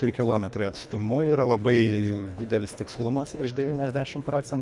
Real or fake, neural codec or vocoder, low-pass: fake; codec, 24 kHz, 1.5 kbps, HILCodec; 10.8 kHz